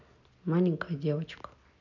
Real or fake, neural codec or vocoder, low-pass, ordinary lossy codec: real; none; 7.2 kHz; none